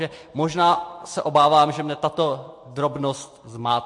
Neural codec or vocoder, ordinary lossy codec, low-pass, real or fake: none; MP3, 48 kbps; 10.8 kHz; real